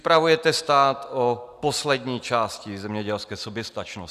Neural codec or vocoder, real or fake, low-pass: none; real; 14.4 kHz